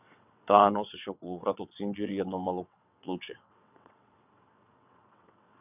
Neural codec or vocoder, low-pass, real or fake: vocoder, 22.05 kHz, 80 mel bands, WaveNeXt; 3.6 kHz; fake